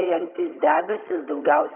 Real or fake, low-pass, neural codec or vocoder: fake; 3.6 kHz; vocoder, 22.05 kHz, 80 mel bands, HiFi-GAN